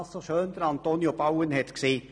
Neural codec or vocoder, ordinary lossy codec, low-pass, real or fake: none; none; 9.9 kHz; real